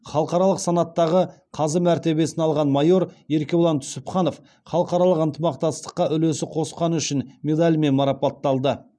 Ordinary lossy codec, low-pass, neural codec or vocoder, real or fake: none; none; none; real